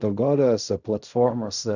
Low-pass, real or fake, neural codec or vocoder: 7.2 kHz; fake; codec, 16 kHz in and 24 kHz out, 0.4 kbps, LongCat-Audio-Codec, fine tuned four codebook decoder